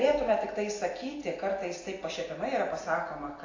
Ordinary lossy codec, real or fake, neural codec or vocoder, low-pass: AAC, 32 kbps; real; none; 7.2 kHz